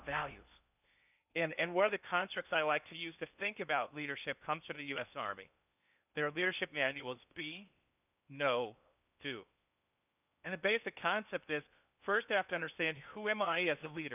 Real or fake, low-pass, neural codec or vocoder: fake; 3.6 kHz; codec, 16 kHz in and 24 kHz out, 0.8 kbps, FocalCodec, streaming, 65536 codes